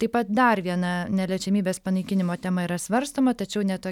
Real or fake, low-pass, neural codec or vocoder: real; 19.8 kHz; none